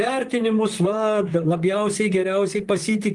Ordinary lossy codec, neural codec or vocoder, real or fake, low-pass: Opus, 24 kbps; vocoder, 44.1 kHz, 128 mel bands, Pupu-Vocoder; fake; 10.8 kHz